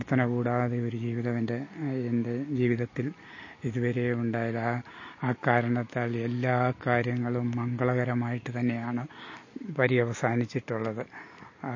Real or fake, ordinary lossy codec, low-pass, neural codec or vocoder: real; MP3, 32 kbps; 7.2 kHz; none